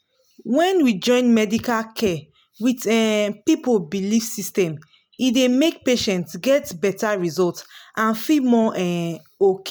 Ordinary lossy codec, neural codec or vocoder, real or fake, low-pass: none; none; real; none